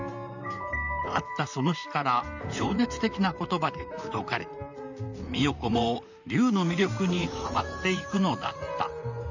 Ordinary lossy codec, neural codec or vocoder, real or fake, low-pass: none; vocoder, 44.1 kHz, 128 mel bands, Pupu-Vocoder; fake; 7.2 kHz